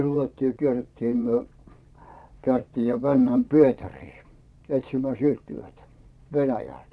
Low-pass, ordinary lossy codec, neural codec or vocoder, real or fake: none; none; vocoder, 22.05 kHz, 80 mel bands, Vocos; fake